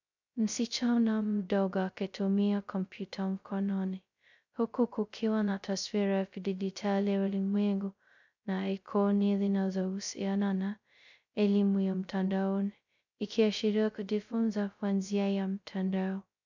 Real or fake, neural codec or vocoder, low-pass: fake; codec, 16 kHz, 0.2 kbps, FocalCodec; 7.2 kHz